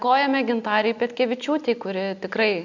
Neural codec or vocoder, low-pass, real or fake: none; 7.2 kHz; real